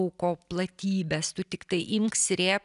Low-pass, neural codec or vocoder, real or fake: 10.8 kHz; none; real